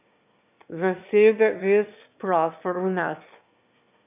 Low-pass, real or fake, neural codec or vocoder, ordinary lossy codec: 3.6 kHz; fake; autoencoder, 22.05 kHz, a latent of 192 numbers a frame, VITS, trained on one speaker; none